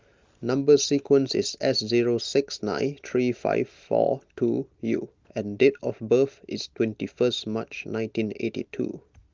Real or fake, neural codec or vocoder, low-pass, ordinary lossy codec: real; none; 7.2 kHz; Opus, 32 kbps